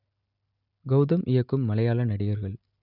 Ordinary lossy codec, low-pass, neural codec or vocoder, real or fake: none; 5.4 kHz; none; real